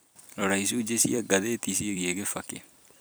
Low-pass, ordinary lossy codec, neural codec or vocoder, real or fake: none; none; vocoder, 44.1 kHz, 128 mel bands every 256 samples, BigVGAN v2; fake